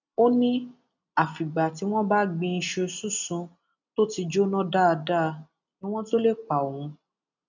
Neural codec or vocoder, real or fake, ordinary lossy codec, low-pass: none; real; none; 7.2 kHz